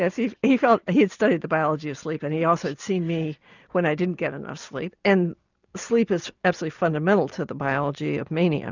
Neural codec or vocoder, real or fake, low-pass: none; real; 7.2 kHz